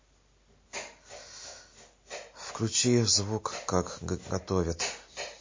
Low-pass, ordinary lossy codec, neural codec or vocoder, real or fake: 7.2 kHz; MP3, 32 kbps; none; real